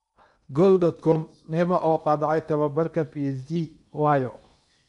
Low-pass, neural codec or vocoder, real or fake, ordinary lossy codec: 10.8 kHz; codec, 16 kHz in and 24 kHz out, 0.8 kbps, FocalCodec, streaming, 65536 codes; fake; none